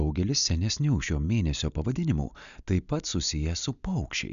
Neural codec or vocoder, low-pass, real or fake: none; 7.2 kHz; real